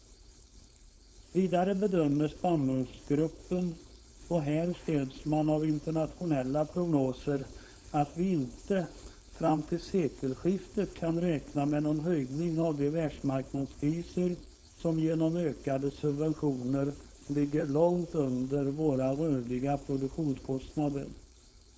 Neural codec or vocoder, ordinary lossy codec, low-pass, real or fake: codec, 16 kHz, 4.8 kbps, FACodec; none; none; fake